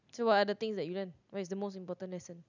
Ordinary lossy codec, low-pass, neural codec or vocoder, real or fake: none; 7.2 kHz; none; real